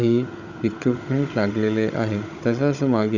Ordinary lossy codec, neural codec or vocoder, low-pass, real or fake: none; codec, 16 kHz, 16 kbps, FunCodec, trained on Chinese and English, 50 frames a second; 7.2 kHz; fake